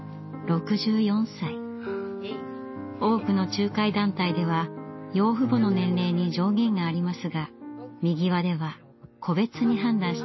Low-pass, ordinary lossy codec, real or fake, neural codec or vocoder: 7.2 kHz; MP3, 24 kbps; real; none